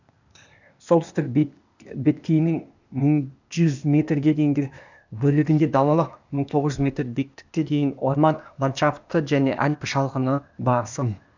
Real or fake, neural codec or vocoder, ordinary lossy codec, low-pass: fake; codec, 16 kHz, 0.8 kbps, ZipCodec; none; 7.2 kHz